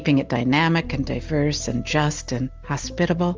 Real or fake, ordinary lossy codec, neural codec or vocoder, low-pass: real; Opus, 32 kbps; none; 7.2 kHz